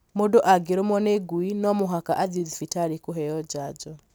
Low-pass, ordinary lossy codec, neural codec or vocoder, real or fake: none; none; none; real